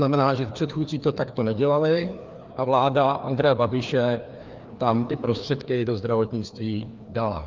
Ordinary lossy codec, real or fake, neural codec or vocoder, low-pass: Opus, 24 kbps; fake; codec, 16 kHz, 2 kbps, FreqCodec, larger model; 7.2 kHz